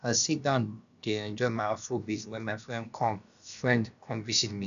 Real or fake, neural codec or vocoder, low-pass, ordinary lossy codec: fake; codec, 16 kHz, about 1 kbps, DyCAST, with the encoder's durations; 7.2 kHz; none